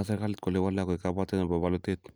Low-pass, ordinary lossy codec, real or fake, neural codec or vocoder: none; none; real; none